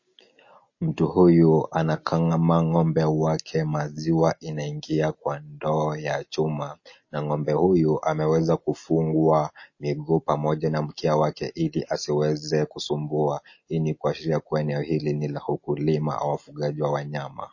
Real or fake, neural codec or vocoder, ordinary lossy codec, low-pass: real; none; MP3, 32 kbps; 7.2 kHz